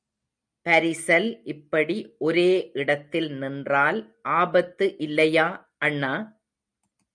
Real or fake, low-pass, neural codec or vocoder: real; 9.9 kHz; none